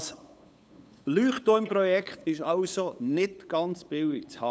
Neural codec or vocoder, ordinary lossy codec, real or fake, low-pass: codec, 16 kHz, 8 kbps, FunCodec, trained on LibriTTS, 25 frames a second; none; fake; none